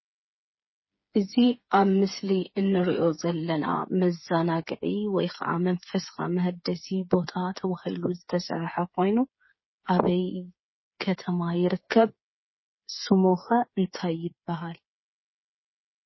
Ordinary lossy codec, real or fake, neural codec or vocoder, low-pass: MP3, 24 kbps; fake; codec, 16 kHz, 8 kbps, FreqCodec, smaller model; 7.2 kHz